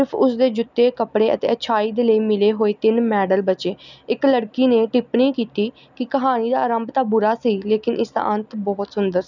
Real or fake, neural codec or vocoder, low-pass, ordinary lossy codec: real; none; 7.2 kHz; none